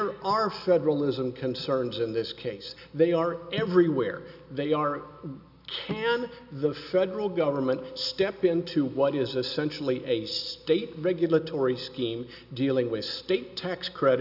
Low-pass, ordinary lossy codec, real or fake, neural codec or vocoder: 5.4 kHz; AAC, 48 kbps; real; none